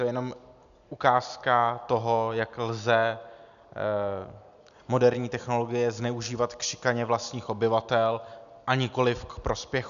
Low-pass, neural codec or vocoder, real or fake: 7.2 kHz; none; real